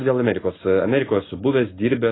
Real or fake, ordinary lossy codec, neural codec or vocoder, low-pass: real; AAC, 16 kbps; none; 7.2 kHz